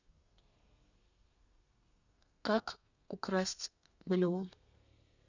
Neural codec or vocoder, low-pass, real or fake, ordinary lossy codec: codec, 32 kHz, 1.9 kbps, SNAC; 7.2 kHz; fake; none